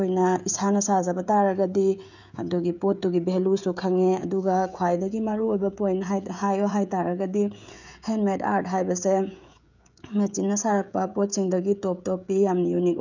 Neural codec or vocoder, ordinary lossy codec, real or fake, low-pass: codec, 16 kHz, 16 kbps, FreqCodec, smaller model; none; fake; 7.2 kHz